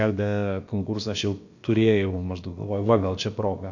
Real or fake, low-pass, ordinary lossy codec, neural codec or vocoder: fake; 7.2 kHz; AAC, 48 kbps; codec, 16 kHz, about 1 kbps, DyCAST, with the encoder's durations